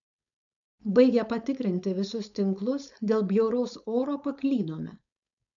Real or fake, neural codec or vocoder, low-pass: fake; codec, 16 kHz, 4.8 kbps, FACodec; 7.2 kHz